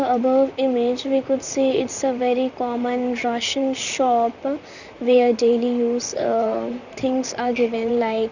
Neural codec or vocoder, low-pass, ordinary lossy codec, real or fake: vocoder, 44.1 kHz, 128 mel bands, Pupu-Vocoder; 7.2 kHz; none; fake